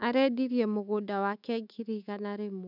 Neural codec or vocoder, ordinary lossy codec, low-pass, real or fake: autoencoder, 48 kHz, 128 numbers a frame, DAC-VAE, trained on Japanese speech; none; 5.4 kHz; fake